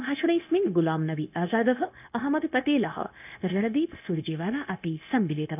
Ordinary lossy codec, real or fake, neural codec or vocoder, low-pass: none; fake; codec, 16 kHz, 0.9 kbps, LongCat-Audio-Codec; 3.6 kHz